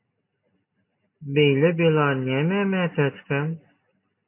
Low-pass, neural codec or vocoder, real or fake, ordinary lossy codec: 3.6 kHz; none; real; MP3, 24 kbps